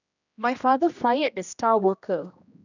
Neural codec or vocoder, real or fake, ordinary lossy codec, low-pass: codec, 16 kHz, 1 kbps, X-Codec, HuBERT features, trained on general audio; fake; none; 7.2 kHz